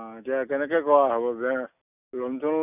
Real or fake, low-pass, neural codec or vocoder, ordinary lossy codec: real; 3.6 kHz; none; none